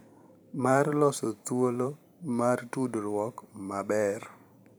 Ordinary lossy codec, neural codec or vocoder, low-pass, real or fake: none; vocoder, 44.1 kHz, 128 mel bands every 512 samples, BigVGAN v2; none; fake